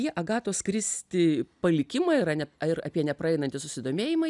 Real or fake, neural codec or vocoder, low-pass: real; none; 10.8 kHz